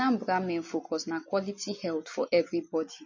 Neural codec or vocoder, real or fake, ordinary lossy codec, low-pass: none; real; MP3, 32 kbps; 7.2 kHz